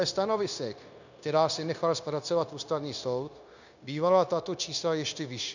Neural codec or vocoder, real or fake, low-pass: codec, 16 kHz, 0.9 kbps, LongCat-Audio-Codec; fake; 7.2 kHz